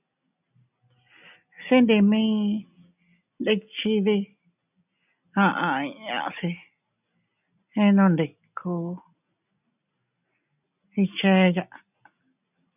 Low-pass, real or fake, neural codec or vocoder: 3.6 kHz; real; none